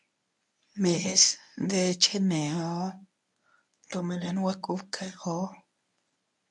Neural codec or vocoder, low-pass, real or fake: codec, 24 kHz, 0.9 kbps, WavTokenizer, medium speech release version 1; 10.8 kHz; fake